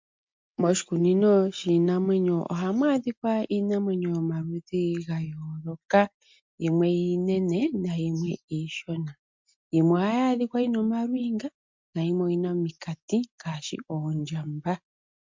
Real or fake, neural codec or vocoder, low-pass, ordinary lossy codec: real; none; 7.2 kHz; MP3, 64 kbps